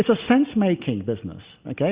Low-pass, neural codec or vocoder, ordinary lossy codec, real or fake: 3.6 kHz; none; Opus, 64 kbps; real